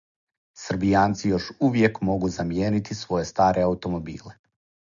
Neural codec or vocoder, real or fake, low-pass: none; real; 7.2 kHz